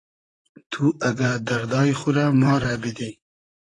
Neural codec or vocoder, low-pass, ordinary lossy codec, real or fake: vocoder, 44.1 kHz, 128 mel bands, Pupu-Vocoder; 10.8 kHz; AAC, 32 kbps; fake